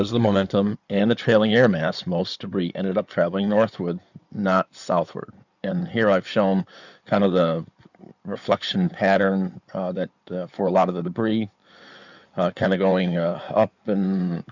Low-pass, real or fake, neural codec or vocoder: 7.2 kHz; fake; codec, 16 kHz in and 24 kHz out, 2.2 kbps, FireRedTTS-2 codec